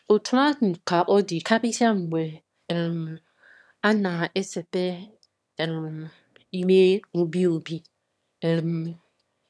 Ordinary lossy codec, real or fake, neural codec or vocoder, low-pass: none; fake; autoencoder, 22.05 kHz, a latent of 192 numbers a frame, VITS, trained on one speaker; none